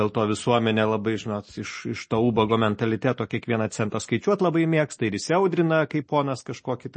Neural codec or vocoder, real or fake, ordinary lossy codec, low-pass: none; real; MP3, 32 kbps; 10.8 kHz